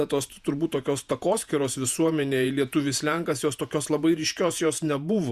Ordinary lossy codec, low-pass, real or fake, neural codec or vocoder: Opus, 64 kbps; 14.4 kHz; fake; vocoder, 48 kHz, 128 mel bands, Vocos